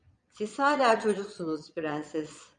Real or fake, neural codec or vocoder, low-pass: fake; vocoder, 22.05 kHz, 80 mel bands, Vocos; 9.9 kHz